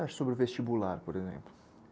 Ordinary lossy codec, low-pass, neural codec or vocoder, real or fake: none; none; none; real